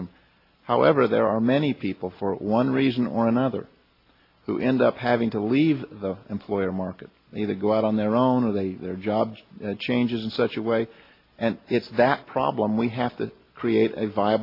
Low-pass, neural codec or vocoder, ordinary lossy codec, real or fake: 5.4 kHz; none; AAC, 32 kbps; real